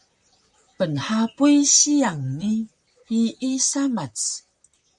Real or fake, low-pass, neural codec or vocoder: fake; 10.8 kHz; vocoder, 44.1 kHz, 128 mel bands, Pupu-Vocoder